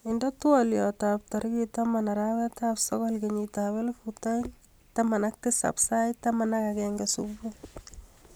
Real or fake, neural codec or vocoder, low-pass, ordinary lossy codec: real; none; none; none